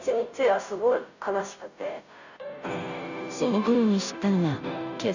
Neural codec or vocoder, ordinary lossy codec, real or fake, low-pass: codec, 16 kHz, 0.5 kbps, FunCodec, trained on Chinese and English, 25 frames a second; none; fake; 7.2 kHz